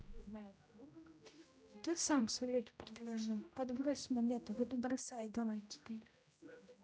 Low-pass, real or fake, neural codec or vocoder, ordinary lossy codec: none; fake; codec, 16 kHz, 0.5 kbps, X-Codec, HuBERT features, trained on general audio; none